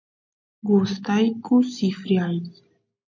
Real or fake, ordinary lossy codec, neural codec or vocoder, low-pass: real; MP3, 48 kbps; none; 7.2 kHz